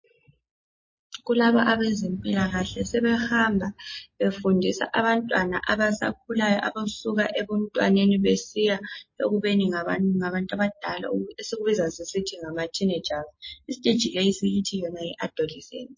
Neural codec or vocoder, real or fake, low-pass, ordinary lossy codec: none; real; 7.2 kHz; MP3, 32 kbps